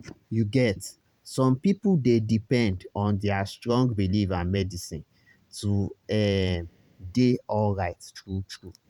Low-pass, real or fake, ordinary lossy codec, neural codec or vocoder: 19.8 kHz; fake; none; vocoder, 44.1 kHz, 128 mel bands every 512 samples, BigVGAN v2